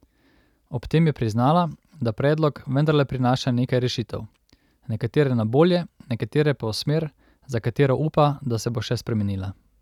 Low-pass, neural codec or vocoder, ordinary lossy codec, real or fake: 19.8 kHz; none; none; real